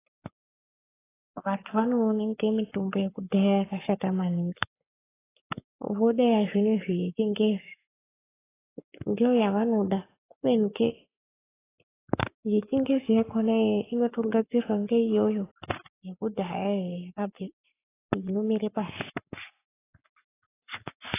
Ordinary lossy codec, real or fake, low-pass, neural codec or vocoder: AAC, 16 kbps; fake; 3.6 kHz; codec, 44.1 kHz, 7.8 kbps, Pupu-Codec